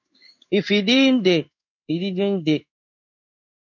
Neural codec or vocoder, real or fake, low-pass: codec, 16 kHz in and 24 kHz out, 1 kbps, XY-Tokenizer; fake; 7.2 kHz